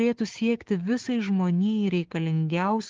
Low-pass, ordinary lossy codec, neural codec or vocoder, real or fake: 7.2 kHz; Opus, 16 kbps; codec, 16 kHz, 6 kbps, DAC; fake